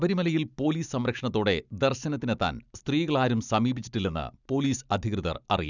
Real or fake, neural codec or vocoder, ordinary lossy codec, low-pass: real; none; none; 7.2 kHz